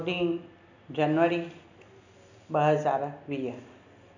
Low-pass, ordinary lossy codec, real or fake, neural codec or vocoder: 7.2 kHz; none; real; none